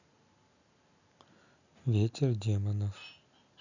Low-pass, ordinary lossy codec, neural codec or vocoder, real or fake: 7.2 kHz; none; none; real